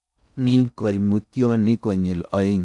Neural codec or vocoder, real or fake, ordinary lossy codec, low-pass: codec, 16 kHz in and 24 kHz out, 0.6 kbps, FocalCodec, streaming, 4096 codes; fake; none; 10.8 kHz